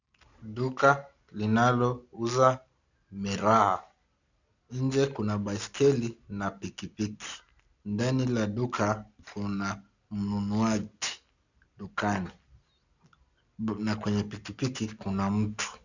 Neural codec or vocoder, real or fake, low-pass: none; real; 7.2 kHz